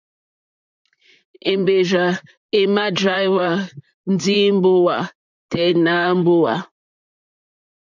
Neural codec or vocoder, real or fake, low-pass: vocoder, 44.1 kHz, 128 mel bands, Pupu-Vocoder; fake; 7.2 kHz